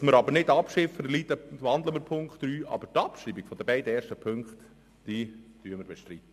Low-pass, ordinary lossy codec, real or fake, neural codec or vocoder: 14.4 kHz; MP3, 96 kbps; real; none